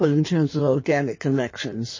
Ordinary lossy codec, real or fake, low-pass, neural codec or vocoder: MP3, 32 kbps; fake; 7.2 kHz; codec, 16 kHz in and 24 kHz out, 1.1 kbps, FireRedTTS-2 codec